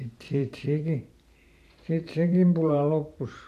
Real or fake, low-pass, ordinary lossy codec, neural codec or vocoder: fake; 14.4 kHz; MP3, 64 kbps; vocoder, 44.1 kHz, 128 mel bands every 512 samples, BigVGAN v2